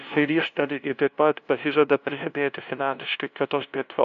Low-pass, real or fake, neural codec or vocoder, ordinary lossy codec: 7.2 kHz; fake; codec, 16 kHz, 0.5 kbps, FunCodec, trained on LibriTTS, 25 frames a second; AAC, 96 kbps